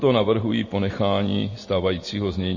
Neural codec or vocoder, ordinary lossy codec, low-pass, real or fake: none; MP3, 32 kbps; 7.2 kHz; real